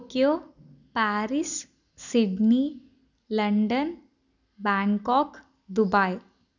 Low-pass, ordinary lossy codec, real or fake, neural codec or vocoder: 7.2 kHz; none; real; none